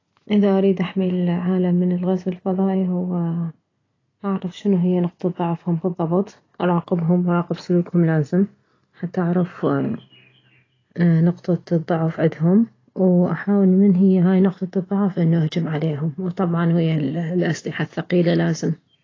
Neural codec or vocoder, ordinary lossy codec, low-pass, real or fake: none; AAC, 32 kbps; 7.2 kHz; real